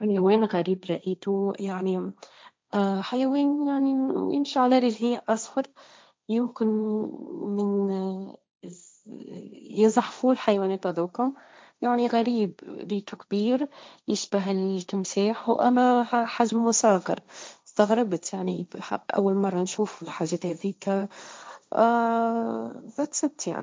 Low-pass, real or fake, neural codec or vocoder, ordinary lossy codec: none; fake; codec, 16 kHz, 1.1 kbps, Voila-Tokenizer; none